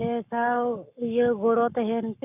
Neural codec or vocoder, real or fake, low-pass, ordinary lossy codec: codec, 44.1 kHz, 7.8 kbps, Pupu-Codec; fake; 3.6 kHz; none